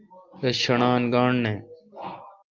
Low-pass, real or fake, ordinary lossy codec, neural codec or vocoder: 7.2 kHz; real; Opus, 32 kbps; none